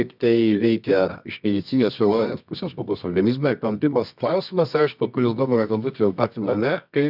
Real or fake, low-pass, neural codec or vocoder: fake; 5.4 kHz; codec, 24 kHz, 0.9 kbps, WavTokenizer, medium music audio release